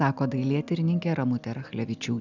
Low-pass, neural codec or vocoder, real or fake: 7.2 kHz; none; real